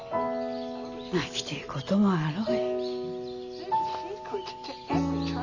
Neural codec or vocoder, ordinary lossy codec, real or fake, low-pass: none; none; real; 7.2 kHz